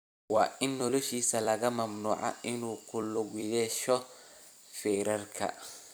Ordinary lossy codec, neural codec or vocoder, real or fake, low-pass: none; vocoder, 44.1 kHz, 128 mel bands every 256 samples, BigVGAN v2; fake; none